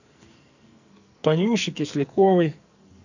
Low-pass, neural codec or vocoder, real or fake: 7.2 kHz; codec, 44.1 kHz, 2.6 kbps, SNAC; fake